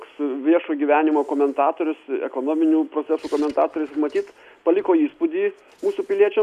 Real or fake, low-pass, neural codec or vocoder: real; 14.4 kHz; none